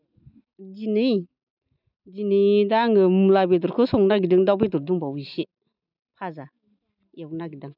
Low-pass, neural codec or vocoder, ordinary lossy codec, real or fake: 5.4 kHz; none; none; real